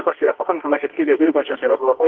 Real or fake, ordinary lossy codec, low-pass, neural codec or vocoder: fake; Opus, 16 kbps; 7.2 kHz; codec, 24 kHz, 0.9 kbps, WavTokenizer, medium music audio release